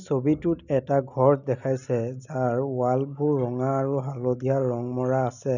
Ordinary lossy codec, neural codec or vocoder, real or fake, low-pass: none; none; real; 7.2 kHz